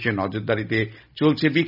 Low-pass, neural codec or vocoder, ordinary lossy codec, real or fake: 5.4 kHz; none; none; real